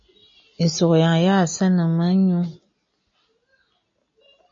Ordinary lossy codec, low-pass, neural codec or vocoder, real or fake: MP3, 32 kbps; 7.2 kHz; none; real